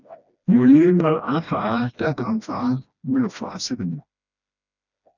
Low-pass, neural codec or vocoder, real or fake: 7.2 kHz; codec, 16 kHz, 1 kbps, FreqCodec, smaller model; fake